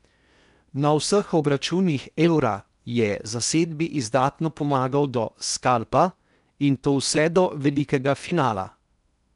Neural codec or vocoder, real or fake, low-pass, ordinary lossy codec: codec, 16 kHz in and 24 kHz out, 0.8 kbps, FocalCodec, streaming, 65536 codes; fake; 10.8 kHz; none